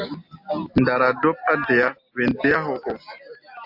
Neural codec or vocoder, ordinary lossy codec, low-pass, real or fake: none; AAC, 48 kbps; 5.4 kHz; real